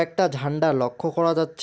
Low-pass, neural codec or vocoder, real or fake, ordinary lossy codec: none; none; real; none